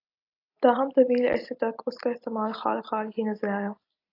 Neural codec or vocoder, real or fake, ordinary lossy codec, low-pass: none; real; AAC, 32 kbps; 5.4 kHz